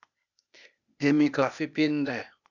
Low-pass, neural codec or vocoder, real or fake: 7.2 kHz; codec, 16 kHz, 0.8 kbps, ZipCodec; fake